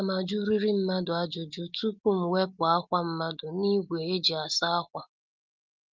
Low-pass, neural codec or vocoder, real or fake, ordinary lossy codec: 7.2 kHz; none; real; Opus, 24 kbps